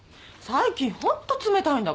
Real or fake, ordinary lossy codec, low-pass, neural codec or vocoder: real; none; none; none